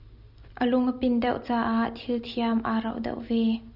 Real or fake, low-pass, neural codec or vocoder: real; 5.4 kHz; none